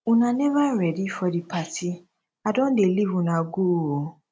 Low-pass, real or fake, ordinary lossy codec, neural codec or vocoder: none; real; none; none